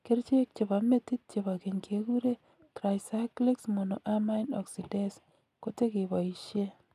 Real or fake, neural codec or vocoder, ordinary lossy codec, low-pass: real; none; none; 14.4 kHz